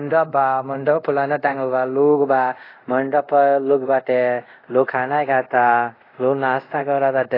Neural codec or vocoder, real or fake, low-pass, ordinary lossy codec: codec, 24 kHz, 0.5 kbps, DualCodec; fake; 5.4 kHz; AAC, 24 kbps